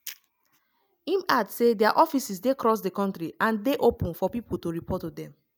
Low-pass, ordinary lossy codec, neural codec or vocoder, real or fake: none; none; none; real